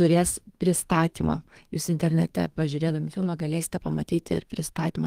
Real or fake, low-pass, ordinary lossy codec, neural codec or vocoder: fake; 14.4 kHz; Opus, 32 kbps; codec, 32 kHz, 1.9 kbps, SNAC